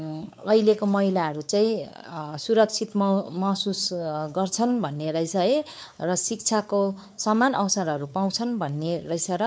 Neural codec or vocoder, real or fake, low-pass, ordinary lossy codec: codec, 16 kHz, 4 kbps, X-Codec, WavLM features, trained on Multilingual LibriSpeech; fake; none; none